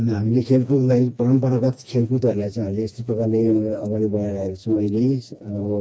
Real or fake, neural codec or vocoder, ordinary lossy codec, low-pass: fake; codec, 16 kHz, 2 kbps, FreqCodec, smaller model; none; none